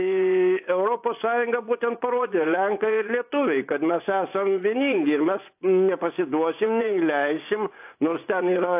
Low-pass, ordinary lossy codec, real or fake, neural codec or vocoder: 3.6 kHz; AAC, 32 kbps; fake; vocoder, 24 kHz, 100 mel bands, Vocos